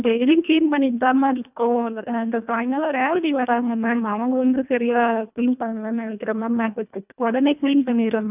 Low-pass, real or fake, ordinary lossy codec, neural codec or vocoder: 3.6 kHz; fake; none; codec, 24 kHz, 1.5 kbps, HILCodec